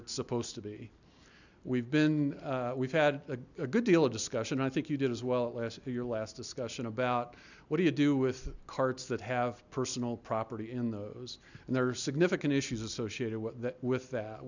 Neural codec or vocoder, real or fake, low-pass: none; real; 7.2 kHz